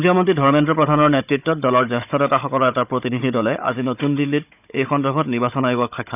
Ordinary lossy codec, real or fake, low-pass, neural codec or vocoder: none; fake; 3.6 kHz; codec, 16 kHz, 16 kbps, FunCodec, trained on Chinese and English, 50 frames a second